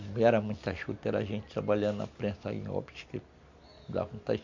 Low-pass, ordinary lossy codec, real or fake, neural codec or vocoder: 7.2 kHz; MP3, 64 kbps; real; none